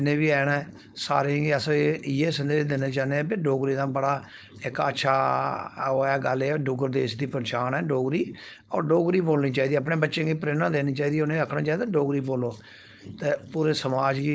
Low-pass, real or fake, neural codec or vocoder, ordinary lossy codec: none; fake; codec, 16 kHz, 4.8 kbps, FACodec; none